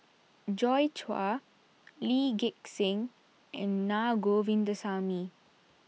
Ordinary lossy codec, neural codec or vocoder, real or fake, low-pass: none; none; real; none